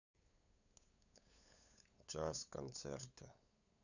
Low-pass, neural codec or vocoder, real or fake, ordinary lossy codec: 7.2 kHz; codec, 16 kHz, 8 kbps, FunCodec, trained on LibriTTS, 25 frames a second; fake; none